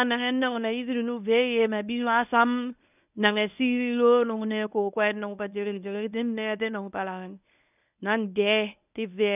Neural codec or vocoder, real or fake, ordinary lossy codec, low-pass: codec, 24 kHz, 0.9 kbps, WavTokenizer, medium speech release version 1; fake; none; 3.6 kHz